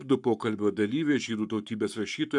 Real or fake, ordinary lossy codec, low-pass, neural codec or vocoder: fake; MP3, 96 kbps; 10.8 kHz; codec, 44.1 kHz, 7.8 kbps, Pupu-Codec